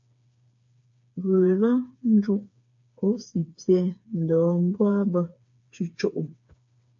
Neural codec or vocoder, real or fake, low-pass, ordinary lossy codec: codec, 16 kHz, 4 kbps, FreqCodec, smaller model; fake; 7.2 kHz; MP3, 48 kbps